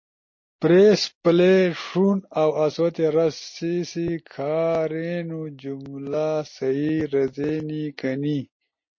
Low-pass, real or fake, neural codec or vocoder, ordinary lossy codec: 7.2 kHz; real; none; MP3, 32 kbps